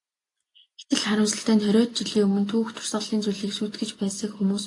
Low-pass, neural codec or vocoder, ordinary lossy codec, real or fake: 10.8 kHz; none; AAC, 48 kbps; real